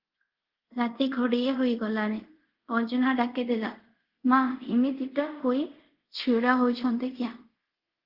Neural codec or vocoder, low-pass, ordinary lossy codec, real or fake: codec, 24 kHz, 0.5 kbps, DualCodec; 5.4 kHz; Opus, 16 kbps; fake